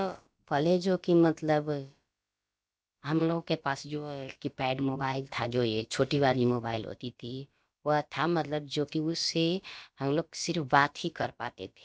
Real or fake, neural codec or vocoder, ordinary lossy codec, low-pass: fake; codec, 16 kHz, about 1 kbps, DyCAST, with the encoder's durations; none; none